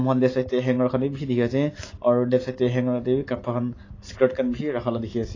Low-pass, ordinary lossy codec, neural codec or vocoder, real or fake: 7.2 kHz; AAC, 32 kbps; none; real